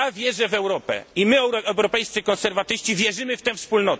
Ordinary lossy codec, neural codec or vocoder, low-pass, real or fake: none; none; none; real